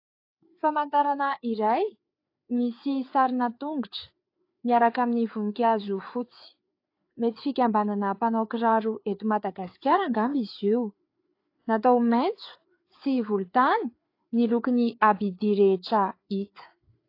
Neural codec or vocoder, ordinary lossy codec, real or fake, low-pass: codec, 16 kHz, 4 kbps, FreqCodec, larger model; AAC, 32 kbps; fake; 5.4 kHz